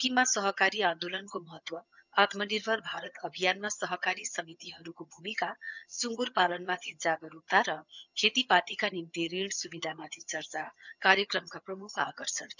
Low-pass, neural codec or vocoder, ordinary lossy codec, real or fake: 7.2 kHz; vocoder, 22.05 kHz, 80 mel bands, HiFi-GAN; none; fake